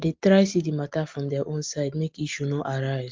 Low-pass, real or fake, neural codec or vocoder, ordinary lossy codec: 7.2 kHz; real; none; Opus, 24 kbps